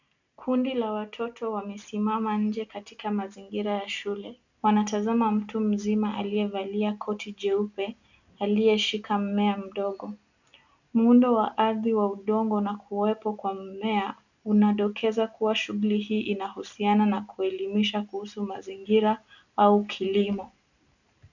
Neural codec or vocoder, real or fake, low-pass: none; real; 7.2 kHz